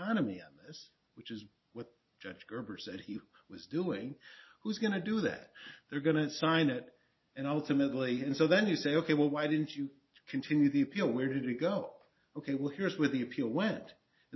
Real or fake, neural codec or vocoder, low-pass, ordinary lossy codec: real; none; 7.2 kHz; MP3, 24 kbps